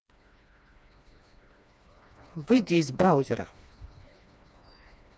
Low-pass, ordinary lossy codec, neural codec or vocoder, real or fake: none; none; codec, 16 kHz, 2 kbps, FreqCodec, smaller model; fake